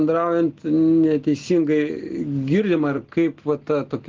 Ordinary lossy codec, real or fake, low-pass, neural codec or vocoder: Opus, 16 kbps; real; 7.2 kHz; none